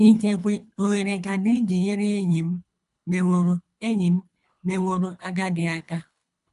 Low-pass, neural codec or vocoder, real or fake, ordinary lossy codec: 10.8 kHz; codec, 24 kHz, 3 kbps, HILCodec; fake; AAC, 96 kbps